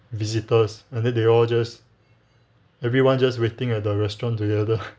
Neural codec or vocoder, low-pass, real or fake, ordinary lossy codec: none; none; real; none